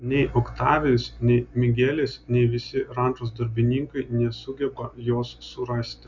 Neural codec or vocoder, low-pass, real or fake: none; 7.2 kHz; real